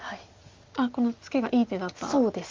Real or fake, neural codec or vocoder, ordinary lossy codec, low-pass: real; none; Opus, 32 kbps; 7.2 kHz